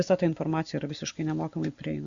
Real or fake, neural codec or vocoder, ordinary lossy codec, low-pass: real; none; Opus, 64 kbps; 7.2 kHz